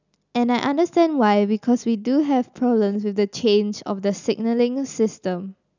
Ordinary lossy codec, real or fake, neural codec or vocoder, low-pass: none; real; none; 7.2 kHz